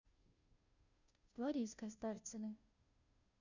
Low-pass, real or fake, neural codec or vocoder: 7.2 kHz; fake; codec, 16 kHz, 0.5 kbps, FunCodec, trained on Chinese and English, 25 frames a second